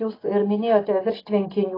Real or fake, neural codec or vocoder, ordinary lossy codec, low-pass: real; none; AAC, 24 kbps; 5.4 kHz